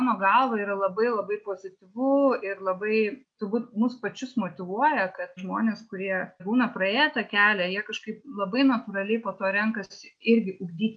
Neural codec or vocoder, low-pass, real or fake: none; 9.9 kHz; real